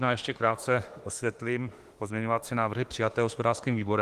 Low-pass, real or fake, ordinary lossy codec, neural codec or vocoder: 14.4 kHz; fake; Opus, 24 kbps; autoencoder, 48 kHz, 32 numbers a frame, DAC-VAE, trained on Japanese speech